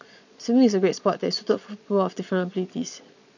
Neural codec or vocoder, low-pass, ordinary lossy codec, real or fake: vocoder, 22.05 kHz, 80 mel bands, Vocos; 7.2 kHz; none; fake